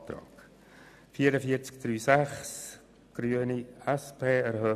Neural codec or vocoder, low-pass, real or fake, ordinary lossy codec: none; 14.4 kHz; real; none